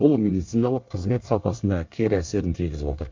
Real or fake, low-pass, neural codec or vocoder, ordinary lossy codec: fake; 7.2 kHz; codec, 24 kHz, 1 kbps, SNAC; MP3, 64 kbps